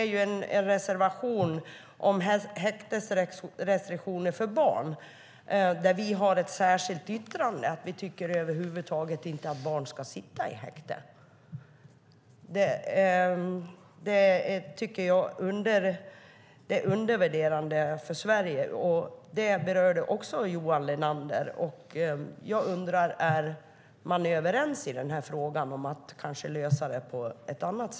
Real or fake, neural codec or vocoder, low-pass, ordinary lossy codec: real; none; none; none